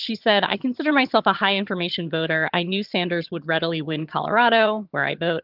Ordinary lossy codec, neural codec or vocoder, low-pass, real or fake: Opus, 32 kbps; vocoder, 22.05 kHz, 80 mel bands, HiFi-GAN; 5.4 kHz; fake